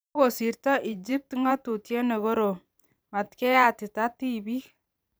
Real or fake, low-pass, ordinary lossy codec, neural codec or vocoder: fake; none; none; vocoder, 44.1 kHz, 128 mel bands every 512 samples, BigVGAN v2